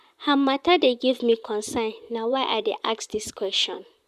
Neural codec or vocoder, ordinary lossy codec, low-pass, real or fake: vocoder, 44.1 kHz, 128 mel bands, Pupu-Vocoder; none; 14.4 kHz; fake